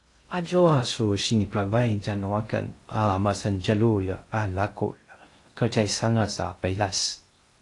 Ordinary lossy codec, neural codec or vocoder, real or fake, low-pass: AAC, 48 kbps; codec, 16 kHz in and 24 kHz out, 0.6 kbps, FocalCodec, streaming, 2048 codes; fake; 10.8 kHz